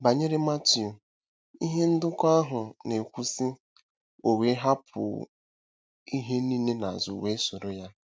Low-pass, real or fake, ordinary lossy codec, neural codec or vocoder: none; real; none; none